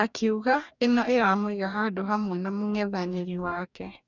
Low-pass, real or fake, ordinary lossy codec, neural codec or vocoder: 7.2 kHz; fake; none; codec, 44.1 kHz, 2.6 kbps, DAC